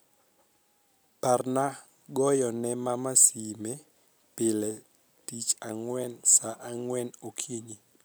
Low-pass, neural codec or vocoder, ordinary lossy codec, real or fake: none; none; none; real